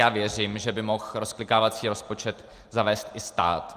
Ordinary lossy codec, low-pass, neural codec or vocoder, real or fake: Opus, 32 kbps; 14.4 kHz; none; real